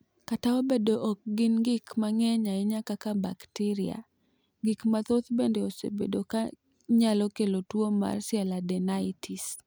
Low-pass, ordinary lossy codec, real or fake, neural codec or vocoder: none; none; real; none